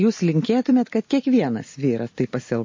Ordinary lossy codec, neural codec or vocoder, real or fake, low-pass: MP3, 32 kbps; none; real; 7.2 kHz